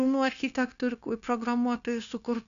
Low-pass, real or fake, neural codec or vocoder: 7.2 kHz; fake; codec, 16 kHz, 0.9 kbps, LongCat-Audio-Codec